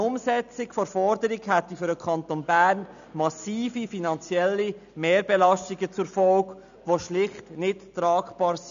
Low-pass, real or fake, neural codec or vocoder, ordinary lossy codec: 7.2 kHz; real; none; none